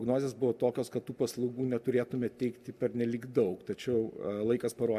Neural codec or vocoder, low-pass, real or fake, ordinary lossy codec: none; 14.4 kHz; real; MP3, 96 kbps